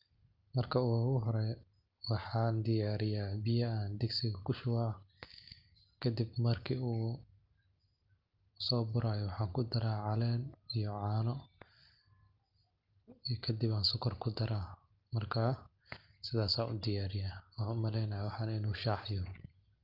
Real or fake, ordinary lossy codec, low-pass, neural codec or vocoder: real; Opus, 24 kbps; 5.4 kHz; none